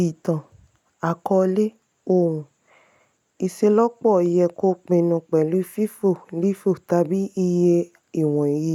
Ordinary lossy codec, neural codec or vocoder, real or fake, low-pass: none; none; real; 19.8 kHz